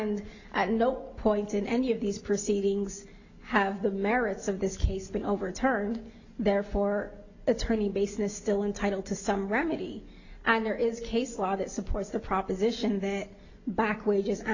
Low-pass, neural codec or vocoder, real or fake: 7.2 kHz; none; real